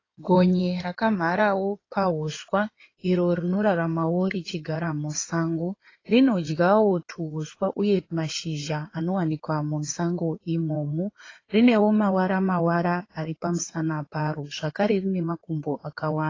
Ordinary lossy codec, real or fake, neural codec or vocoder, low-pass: AAC, 32 kbps; fake; codec, 16 kHz in and 24 kHz out, 2.2 kbps, FireRedTTS-2 codec; 7.2 kHz